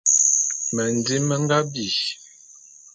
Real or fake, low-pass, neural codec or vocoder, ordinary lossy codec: real; 9.9 kHz; none; MP3, 64 kbps